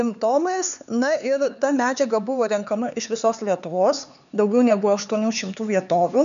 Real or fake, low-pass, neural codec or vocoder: fake; 7.2 kHz; codec, 16 kHz, 4 kbps, X-Codec, HuBERT features, trained on LibriSpeech